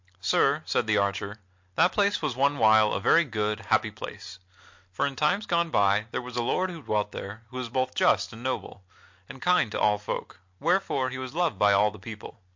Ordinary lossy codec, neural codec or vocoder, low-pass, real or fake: MP3, 48 kbps; none; 7.2 kHz; real